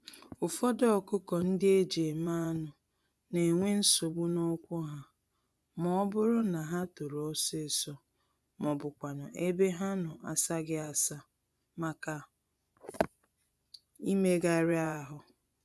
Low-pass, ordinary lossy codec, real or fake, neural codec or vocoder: none; none; fake; vocoder, 24 kHz, 100 mel bands, Vocos